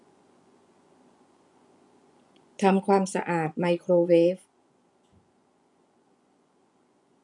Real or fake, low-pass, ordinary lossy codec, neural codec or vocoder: fake; 10.8 kHz; none; vocoder, 24 kHz, 100 mel bands, Vocos